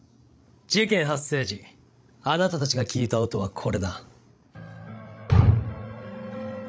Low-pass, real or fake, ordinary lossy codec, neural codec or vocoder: none; fake; none; codec, 16 kHz, 16 kbps, FreqCodec, larger model